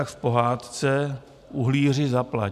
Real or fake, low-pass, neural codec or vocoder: real; 14.4 kHz; none